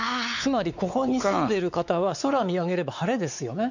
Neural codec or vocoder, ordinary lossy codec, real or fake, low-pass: codec, 16 kHz, 4 kbps, X-Codec, WavLM features, trained on Multilingual LibriSpeech; none; fake; 7.2 kHz